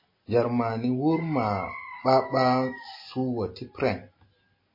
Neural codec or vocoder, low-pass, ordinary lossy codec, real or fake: none; 5.4 kHz; MP3, 24 kbps; real